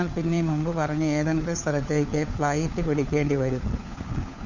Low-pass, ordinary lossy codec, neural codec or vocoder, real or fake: 7.2 kHz; none; codec, 16 kHz, 4 kbps, FunCodec, trained on LibriTTS, 50 frames a second; fake